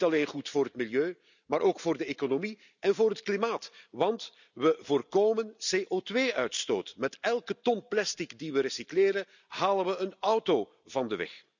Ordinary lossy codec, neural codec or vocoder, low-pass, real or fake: none; none; 7.2 kHz; real